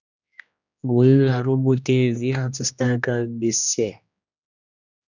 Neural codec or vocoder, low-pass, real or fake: codec, 16 kHz, 1 kbps, X-Codec, HuBERT features, trained on general audio; 7.2 kHz; fake